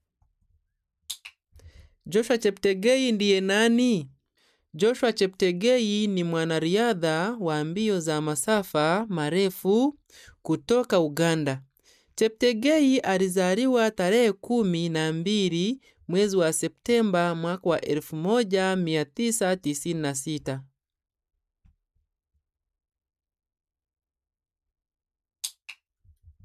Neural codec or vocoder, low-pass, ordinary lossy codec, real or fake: none; 14.4 kHz; none; real